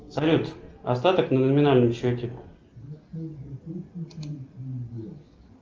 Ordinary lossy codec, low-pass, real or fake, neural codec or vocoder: Opus, 24 kbps; 7.2 kHz; real; none